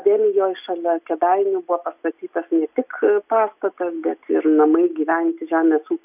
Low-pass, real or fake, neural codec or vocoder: 3.6 kHz; real; none